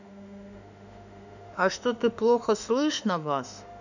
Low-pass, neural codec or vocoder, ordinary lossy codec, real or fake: 7.2 kHz; autoencoder, 48 kHz, 32 numbers a frame, DAC-VAE, trained on Japanese speech; none; fake